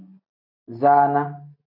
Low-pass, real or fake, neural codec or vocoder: 5.4 kHz; real; none